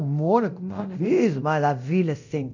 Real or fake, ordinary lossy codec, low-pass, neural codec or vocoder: fake; none; 7.2 kHz; codec, 24 kHz, 0.9 kbps, DualCodec